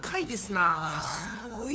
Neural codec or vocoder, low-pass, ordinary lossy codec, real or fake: codec, 16 kHz, 2 kbps, FunCodec, trained on LibriTTS, 25 frames a second; none; none; fake